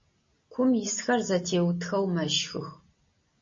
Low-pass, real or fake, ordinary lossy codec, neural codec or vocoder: 7.2 kHz; real; MP3, 32 kbps; none